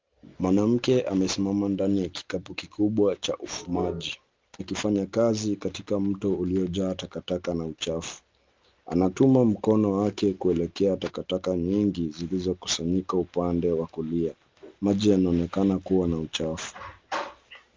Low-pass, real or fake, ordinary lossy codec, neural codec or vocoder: 7.2 kHz; real; Opus, 32 kbps; none